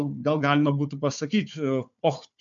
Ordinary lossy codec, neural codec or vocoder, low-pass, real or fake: MP3, 64 kbps; codec, 16 kHz, 4 kbps, FunCodec, trained on Chinese and English, 50 frames a second; 7.2 kHz; fake